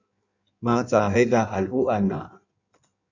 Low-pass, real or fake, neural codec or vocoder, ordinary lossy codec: 7.2 kHz; fake; codec, 16 kHz in and 24 kHz out, 1.1 kbps, FireRedTTS-2 codec; Opus, 64 kbps